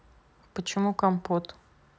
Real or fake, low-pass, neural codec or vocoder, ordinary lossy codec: real; none; none; none